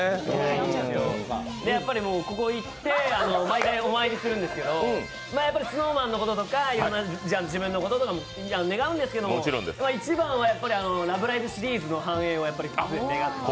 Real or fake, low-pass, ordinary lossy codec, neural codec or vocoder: real; none; none; none